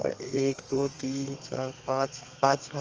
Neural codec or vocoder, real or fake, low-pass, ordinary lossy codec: codec, 32 kHz, 1.9 kbps, SNAC; fake; 7.2 kHz; Opus, 24 kbps